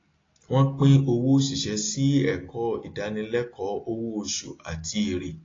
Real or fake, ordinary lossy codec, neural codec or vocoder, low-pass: real; AAC, 32 kbps; none; 7.2 kHz